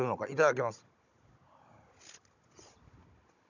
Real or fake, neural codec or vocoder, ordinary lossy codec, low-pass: fake; codec, 16 kHz, 16 kbps, FunCodec, trained on Chinese and English, 50 frames a second; none; 7.2 kHz